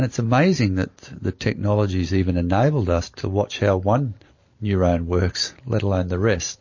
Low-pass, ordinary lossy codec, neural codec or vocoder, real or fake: 7.2 kHz; MP3, 32 kbps; none; real